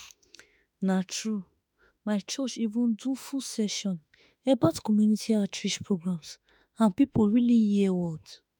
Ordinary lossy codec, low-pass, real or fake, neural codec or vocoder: none; 19.8 kHz; fake; autoencoder, 48 kHz, 32 numbers a frame, DAC-VAE, trained on Japanese speech